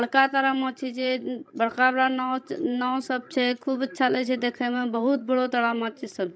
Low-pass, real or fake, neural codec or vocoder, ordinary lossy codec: none; fake; codec, 16 kHz, 16 kbps, FreqCodec, larger model; none